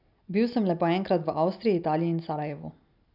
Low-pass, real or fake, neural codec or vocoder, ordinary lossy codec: 5.4 kHz; real; none; none